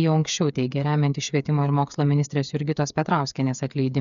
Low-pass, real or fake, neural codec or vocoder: 7.2 kHz; fake; codec, 16 kHz, 8 kbps, FreqCodec, smaller model